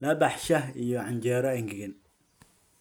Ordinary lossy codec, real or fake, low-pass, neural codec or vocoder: none; real; none; none